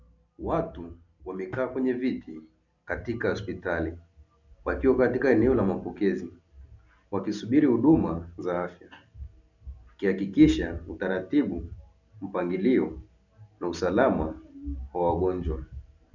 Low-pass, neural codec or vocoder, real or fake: 7.2 kHz; none; real